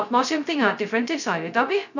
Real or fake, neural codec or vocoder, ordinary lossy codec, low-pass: fake; codec, 16 kHz, 0.2 kbps, FocalCodec; none; 7.2 kHz